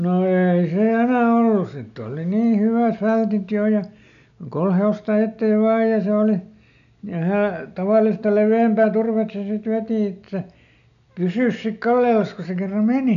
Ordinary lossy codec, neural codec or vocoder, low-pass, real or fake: none; none; 7.2 kHz; real